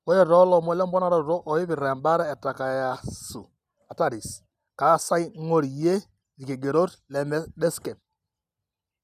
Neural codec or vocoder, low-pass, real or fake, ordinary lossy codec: none; 14.4 kHz; real; none